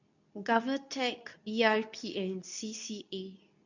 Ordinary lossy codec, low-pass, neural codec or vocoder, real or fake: none; 7.2 kHz; codec, 24 kHz, 0.9 kbps, WavTokenizer, medium speech release version 2; fake